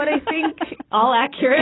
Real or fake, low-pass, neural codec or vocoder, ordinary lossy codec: real; 7.2 kHz; none; AAC, 16 kbps